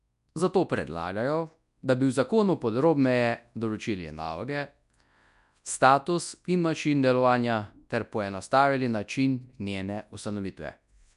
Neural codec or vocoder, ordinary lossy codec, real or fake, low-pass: codec, 24 kHz, 0.9 kbps, WavTokenizer, large speech release; none; fake; 10.8 kHz